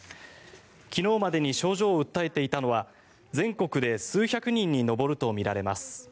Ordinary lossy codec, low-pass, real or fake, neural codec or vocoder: none; none; real; none